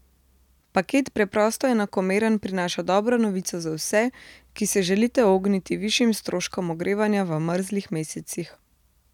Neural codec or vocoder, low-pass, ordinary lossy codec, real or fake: none; 19.8 kHz; none; real